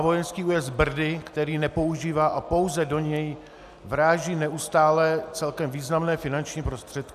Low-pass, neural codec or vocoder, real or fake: 14.4 kHz; none; real